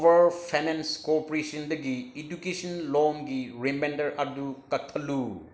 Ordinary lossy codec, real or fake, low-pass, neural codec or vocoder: none; real; none; none